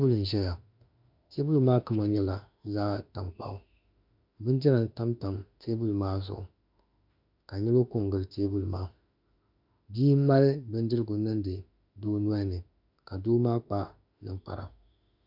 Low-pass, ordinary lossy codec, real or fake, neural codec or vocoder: 5.4 kHz; MP3, 48 kbps; fake; autoencoder, 48 kHz, 32 numbers a frame, DAC-VAE, trained on Japanese speech